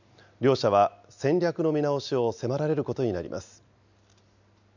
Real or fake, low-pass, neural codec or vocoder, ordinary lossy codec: real; 7.2 kHz; none; none